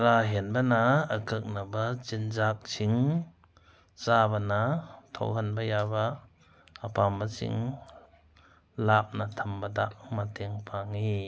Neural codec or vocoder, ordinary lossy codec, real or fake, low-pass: none; none; real; none